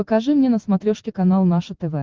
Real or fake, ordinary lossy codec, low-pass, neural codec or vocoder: real; Opus, 16 kbps; 7.2 kHz; none